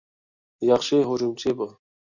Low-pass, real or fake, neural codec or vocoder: 7.2 kHz; real; none